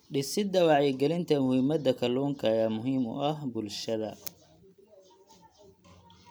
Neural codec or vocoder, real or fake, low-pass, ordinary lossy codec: none; real; none; none